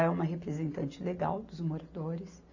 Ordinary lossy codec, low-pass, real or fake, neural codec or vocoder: AAC, 48 kbps; 7.2 kHz; real; none